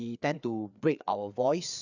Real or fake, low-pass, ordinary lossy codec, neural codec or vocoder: fake; 7.2 kHz; none; codec, 16 kHz, 4 kbps, FreqCodec, larger model